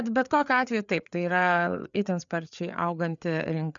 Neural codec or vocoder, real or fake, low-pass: codec, 16 kHz, 4 kbps, FreqCodec, larger model; fake; 7.2 kHz